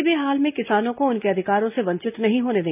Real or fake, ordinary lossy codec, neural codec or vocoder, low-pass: real; none; none; 3.6 kHz